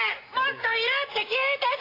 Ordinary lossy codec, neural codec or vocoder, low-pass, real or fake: AAC, 24 kbps; none; 5.4 kHz; real